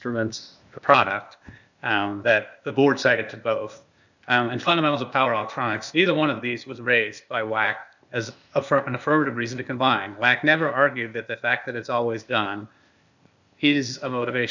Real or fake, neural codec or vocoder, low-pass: fake; codec, 16 kHz, 0.8 kbps, ZipCodec; 7.2 kHz